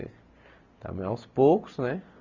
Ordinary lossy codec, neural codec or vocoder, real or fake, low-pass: none; none; real; 7.2 kHz